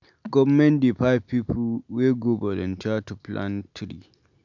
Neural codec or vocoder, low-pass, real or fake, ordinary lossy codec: none; 7.2 kHz; real; none